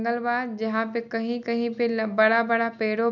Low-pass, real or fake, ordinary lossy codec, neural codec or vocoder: 7.2 kHz; real; none; none